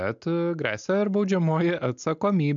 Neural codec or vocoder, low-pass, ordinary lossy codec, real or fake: none; 7.2 kHz; MP3, 64 kbps; real